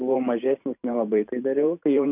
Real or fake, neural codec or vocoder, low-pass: fake; vocoder, 44.1 kHz, 128 mel bands every 512 samples, BigVGAN v2; 3.6 kHz